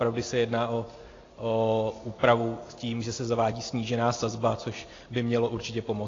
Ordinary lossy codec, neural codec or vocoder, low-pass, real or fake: AAC, 32 kbps; none; 7.2 kHz; real